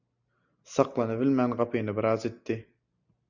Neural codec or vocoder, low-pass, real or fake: none; 7.2 kHz; real